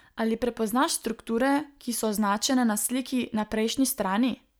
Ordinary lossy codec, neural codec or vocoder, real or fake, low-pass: none; none; real; none